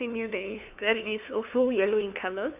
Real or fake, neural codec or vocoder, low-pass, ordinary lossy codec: fake; codec, 16 kHz, 2 kbps, FunCodec, trained on LibriTTS, 25 frames a second; 3.6 kHz; none